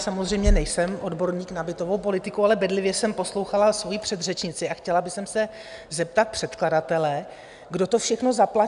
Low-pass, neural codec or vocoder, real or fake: 10.8 kHz; none; real